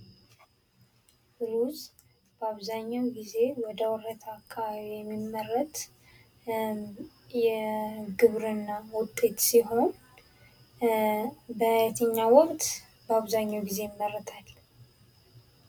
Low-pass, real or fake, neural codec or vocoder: 19.8 kHz; real; none